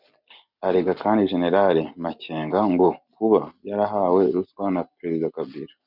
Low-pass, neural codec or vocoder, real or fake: 5.4 kHz; none; real